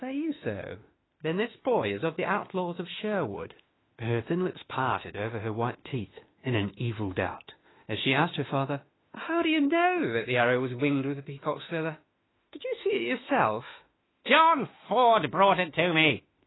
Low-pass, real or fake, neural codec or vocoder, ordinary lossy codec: 7.2 kHz; fake; autoencoder, 48 kHz, 32 numbers a frame, DAC-VAE, trained on Japanese speech; AAC, 16 kbps